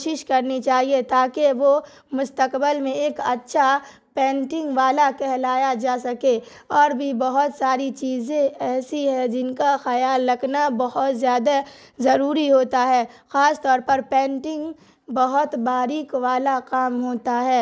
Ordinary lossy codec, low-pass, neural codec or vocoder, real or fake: none; none; none; real